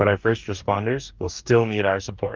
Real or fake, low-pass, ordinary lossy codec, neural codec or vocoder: fake; 7.2 kHz; Opus, 32 kbps; codec, 44.1 kHz, 2.6 kbps, DAC